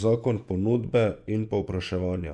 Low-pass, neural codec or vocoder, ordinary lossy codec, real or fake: 10.8 kHz; vocoder, 24 kHz, 100 mel bands, Vocos; none; fake